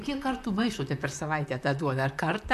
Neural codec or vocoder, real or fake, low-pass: vocoder, 44.1 kHz, 128 mel bands, Pupu-Vocoder; fake; 14.4 kHz